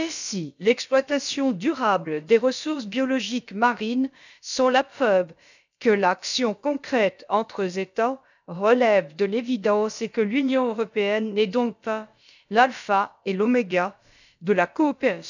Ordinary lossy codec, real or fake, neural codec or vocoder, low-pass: none; fake; codec, 16 kHz, about 1 kbps, DyCAST, with the encoder's durations; 7.2 kHz